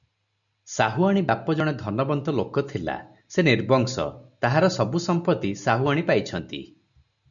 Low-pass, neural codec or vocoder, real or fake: 7.2 kHz; none; real